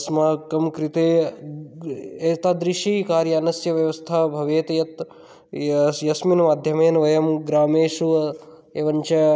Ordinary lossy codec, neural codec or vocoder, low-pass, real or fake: none; none; none; real